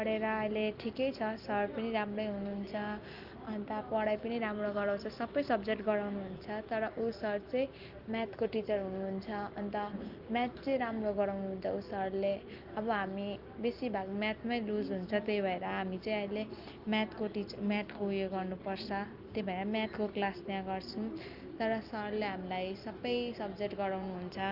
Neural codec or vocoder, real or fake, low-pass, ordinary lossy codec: none; real; 5.4 kHz; Opus, 32 kbps